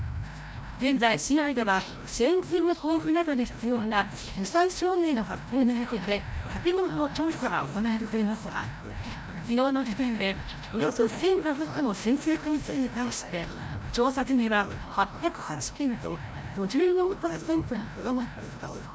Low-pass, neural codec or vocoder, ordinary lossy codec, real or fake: none; codec, 16 kHz, 0.5 kbps, FreqCodec, larger model; none; fake